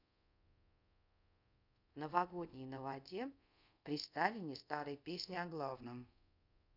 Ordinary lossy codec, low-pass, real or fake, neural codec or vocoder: none; 5.4 kHz; fake; codec, 24 kHz, 0.5 kbps, DualCodec